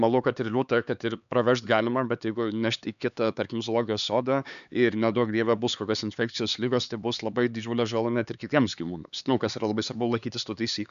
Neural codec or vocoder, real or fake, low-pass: codec, 16 kHz, 4 kbps, X-Codec, HuBERT features, trained on LibriSpeech; fake; 7.2 kHz